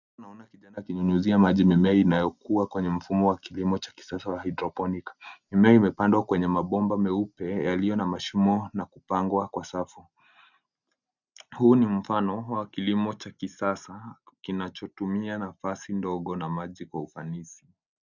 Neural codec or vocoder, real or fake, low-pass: none; real; 7.2 kHz